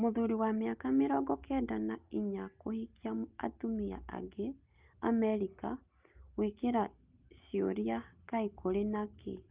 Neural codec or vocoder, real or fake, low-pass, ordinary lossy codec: none; real; 3.6 kHz; Opus, 24 kbps